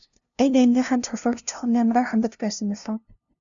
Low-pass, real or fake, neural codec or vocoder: 7.2 kHz; fake; codec, 16 kHz, 0.5 kbps, FunCodec, trained on LibriTTS, 25 frames a second